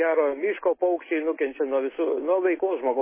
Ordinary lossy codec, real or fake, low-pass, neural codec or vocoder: MP3, 16 kbps; real; 3.6 kHz; none